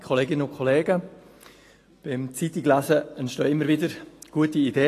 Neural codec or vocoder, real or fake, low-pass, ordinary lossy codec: none; real; 14.4 kHz; AAC, 48 kbps